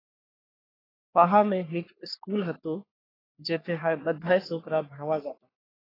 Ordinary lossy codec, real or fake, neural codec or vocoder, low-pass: AAC, 24 kbps; fake; codec, 44.1 kHz, 3.4 kbps, Pupu-Codec; 5.4 kHz